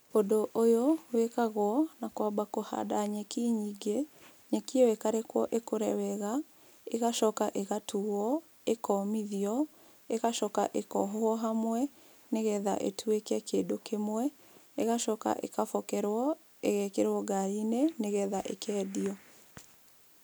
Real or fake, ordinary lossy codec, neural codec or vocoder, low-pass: real; none; none; none